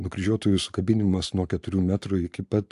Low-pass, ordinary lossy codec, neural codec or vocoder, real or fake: 10.8 kHz; AAC, 64 kbps; none; real